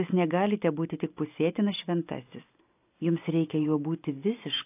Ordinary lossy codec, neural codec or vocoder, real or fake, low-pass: AAC, 24 kbps; none; real; 3.6 kHz